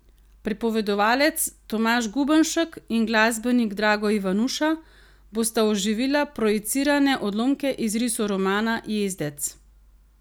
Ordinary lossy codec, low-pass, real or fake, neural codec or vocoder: none; none; real; none